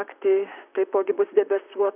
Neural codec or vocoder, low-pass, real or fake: vocoder, 44.1 kHz, 128 mel bands, Pupu-Vocoder; 3.6 kHz; fake